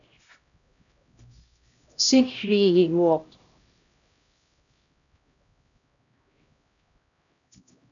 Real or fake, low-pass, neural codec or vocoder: fake; 7.2 kHz; codec, 16 kHz, 0.5 kbps, X-Codec, HuBERT features, trained on LibriSpeech